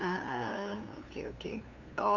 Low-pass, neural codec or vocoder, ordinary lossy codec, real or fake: 7.2 kHz; codec, 16 kHz, 2 kbps, FunCodec, trained on LibriTTS, 25 frames a second; none; fake